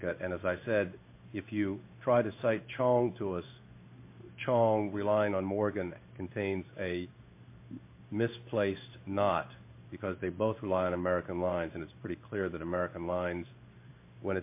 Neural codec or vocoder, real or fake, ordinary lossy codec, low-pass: codec, 16 kHz in and 24 kHz out, 1 kbps, XY-Tokenizer; fake; MP3, 24 kbps; 3.6 kHz